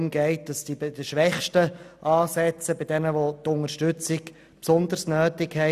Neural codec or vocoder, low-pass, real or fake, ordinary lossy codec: none; 14.4 kHz; real; none